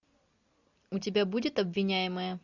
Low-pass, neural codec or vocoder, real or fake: 7.2 kHz; none; real